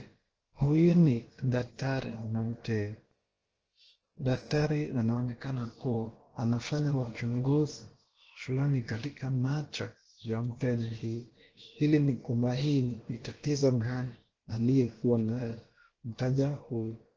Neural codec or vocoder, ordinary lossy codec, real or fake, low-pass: codec, 16 kHz, about 1 kbps, DyCAST, with the encoder's durations; Opus, 16 kbps; fake; 7.2 kHz